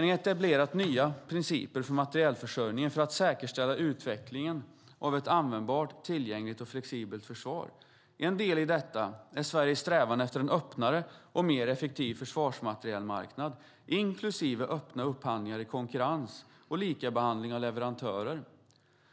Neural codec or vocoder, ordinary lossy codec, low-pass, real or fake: none; none; none; real